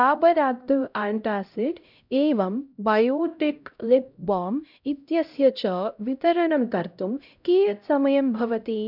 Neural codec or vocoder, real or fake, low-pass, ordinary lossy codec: codec, 16 kHz, 0.5 kbps, X-Codec, HuBERT features, trained on LibriSpeech; fake; 5.4 kHz; none